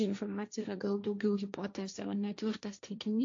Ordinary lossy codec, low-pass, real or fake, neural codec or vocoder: AAC, 48 kbps; 7.2 kHz; fake; codec, 16 kHz, 1.1 kbps, Voila-Tokenizer